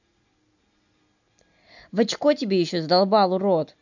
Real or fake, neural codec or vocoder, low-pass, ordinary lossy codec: real; none; 7.2 kHz; none